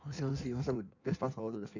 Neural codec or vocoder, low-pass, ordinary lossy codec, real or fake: codec, 16 kHz in and 24 kHz out, 1.1 kbps, FireRedTTS-2 codec; 7.2 kHz; none; fake